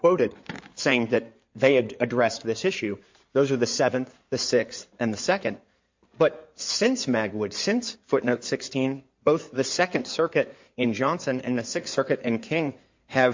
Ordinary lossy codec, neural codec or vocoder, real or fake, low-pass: MP3, 48 kbps; codec, 16 kHz in and 24 kHz out, 2.2 kbps, FireRedTTS-2 codec; fake; 7.2 kHz